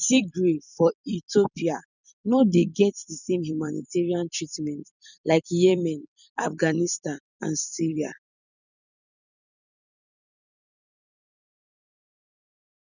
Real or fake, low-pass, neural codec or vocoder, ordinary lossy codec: fake; 7.2 kHz; vocoder, 44.1 kHz, 128 mel bands every 256 samples, BigVGAN v2; none